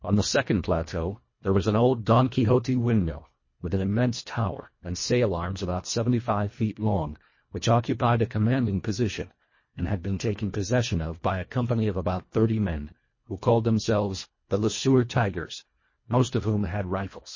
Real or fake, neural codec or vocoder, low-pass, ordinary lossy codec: fake; codec, 24 kHz, 1.5 kbps, HILCodec; 7.2 kHz; MP3, 32 kbps